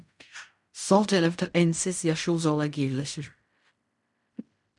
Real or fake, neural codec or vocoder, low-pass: fake; codec, 16 kHz in and 24 kHz out, 0.4 kbps, LongCat-Audio-Codec, fine tuned four codebook decoder; 10.8 kHz